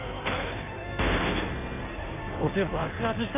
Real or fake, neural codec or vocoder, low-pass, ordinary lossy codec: fake; codec, 16 kHz in and 24 kHz out, 1.1 kbps, FireRedTTS-2 codec; 3.6 kHz; none